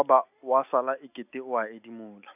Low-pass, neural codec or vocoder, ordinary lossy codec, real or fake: 3.6 kHz; none; AAC, 32 kbps; real